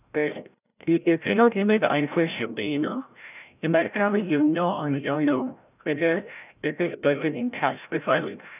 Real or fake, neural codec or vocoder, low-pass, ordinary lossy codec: fake; codec, 16 kHz, 0.5 kbps, FreqCodec, larger model; 3.6 kHz; none